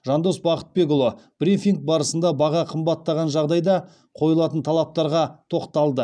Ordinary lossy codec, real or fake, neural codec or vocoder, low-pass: none; real; none; 9.9 kHz